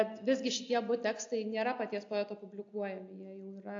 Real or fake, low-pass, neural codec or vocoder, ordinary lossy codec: real; 7.2 kHz; none; AAC, 48 kbps